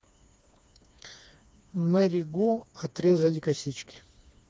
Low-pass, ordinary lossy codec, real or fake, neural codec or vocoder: none; none; fake; codec, 16 kHz, 2 kbps, FreqCodec, smaller model